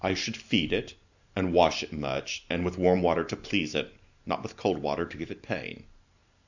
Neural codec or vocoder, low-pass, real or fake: none; 7.2 kHz; real